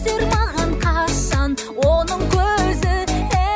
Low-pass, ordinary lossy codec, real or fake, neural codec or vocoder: none; none; real; none